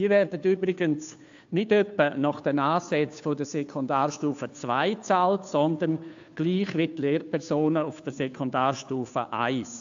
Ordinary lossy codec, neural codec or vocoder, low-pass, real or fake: AAC, 64 kbps; codec, 16 kHz, 2 kbps, FunCodec, trained on Chinese and English, 25 frames a second; 7.2 kHz; fake